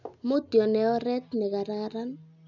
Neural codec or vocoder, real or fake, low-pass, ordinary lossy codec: none; real; 7.2 kHz; none